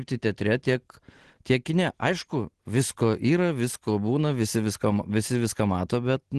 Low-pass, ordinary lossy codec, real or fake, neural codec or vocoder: 10.8 kHz; Opus, 16 kbps; real; none